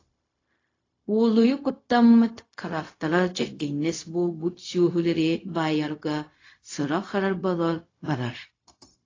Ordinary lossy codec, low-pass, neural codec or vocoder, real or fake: AAC, 32 kbps; 7.2 kHz; codec, 16 kHz, 0.4 kbps, LongCat-Audio-Codec; fake